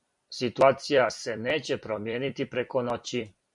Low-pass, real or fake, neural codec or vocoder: 10.8 kHz; fake; vocoder, 44.1 kHz, 128 mel bands every 256 samples, BigVGAN v2